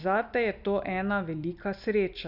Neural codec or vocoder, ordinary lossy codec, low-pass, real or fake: none; none; 5.4 kHz; real